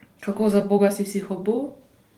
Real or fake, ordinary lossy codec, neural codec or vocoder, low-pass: fake; Opus, 24 kbps; vocoder, 44.1 kHz, 128 mel bands every 256 samples, BigVGAN v2; 19.8 kHz